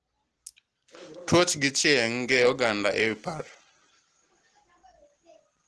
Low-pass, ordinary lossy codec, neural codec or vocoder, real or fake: 10.8 kHz; Opus, 16 kbps; none; real